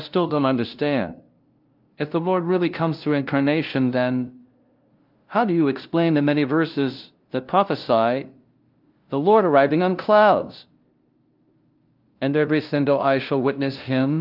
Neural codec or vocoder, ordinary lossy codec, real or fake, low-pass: codec, 16 kHz, 0.5 kbps, FunCodec, trained on LibriTTS, 25 frames a second; Opus, 24 kbps; fake; 5.4 kHz